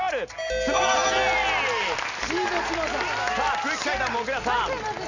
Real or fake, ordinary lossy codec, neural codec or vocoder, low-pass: real; none; none; 7.2 kHz